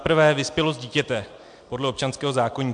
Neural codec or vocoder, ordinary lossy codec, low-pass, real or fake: none; MP3, 64 kbps; 9.9 kHz; real